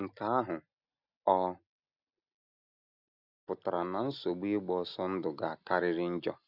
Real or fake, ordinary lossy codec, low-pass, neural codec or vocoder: real; none; 5.4 kHz; none